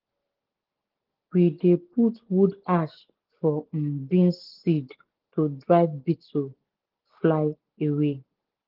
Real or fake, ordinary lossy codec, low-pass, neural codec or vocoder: real; Opus, 16 kbps; 5.4 kHz; none